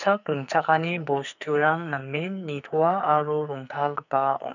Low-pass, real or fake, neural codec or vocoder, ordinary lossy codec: 7.2 kHz; fake; codec, 44.1 kHz, 2.6 kbps, SNAC; none